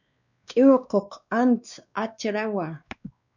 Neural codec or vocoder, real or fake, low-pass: codec, 16 kHz, 2 kbps, X-Codec, WavLM features, trained on Multilingual LibriSpeech; fake; 7.2 kHz